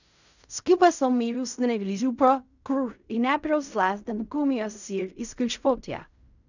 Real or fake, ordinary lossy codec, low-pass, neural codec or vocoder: fake; none; 7.2 kHz; codec, 16 kHz in and 24 kHz out, 0.4 kbps, LongCat-Audio-Codec, fine tuned four codebook decoder